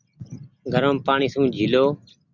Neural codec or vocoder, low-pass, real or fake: none; 7.2 kHz; real